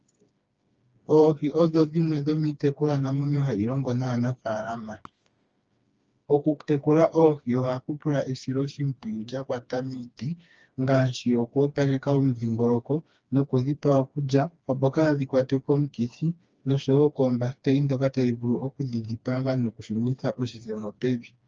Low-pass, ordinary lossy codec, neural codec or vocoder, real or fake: 7.2 kHz; Opus, 24 kbps; codec, 16 kHz, 2 kbps, FreqCodec, smaller model; fake